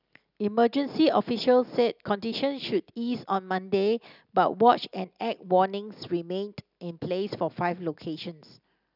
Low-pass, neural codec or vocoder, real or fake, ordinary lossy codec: 5.4 kHz; none; real; none